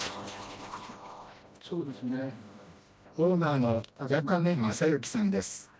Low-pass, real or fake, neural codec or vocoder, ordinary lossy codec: none; fake; codec, 16 kHz, 1 kbps, FreqCodec, smaller model; none